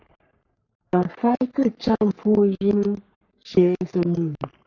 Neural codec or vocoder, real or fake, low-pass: codec, 44.1 kHz, 3.4 kbps, Pupu-Codec; fake; 7.2 kHz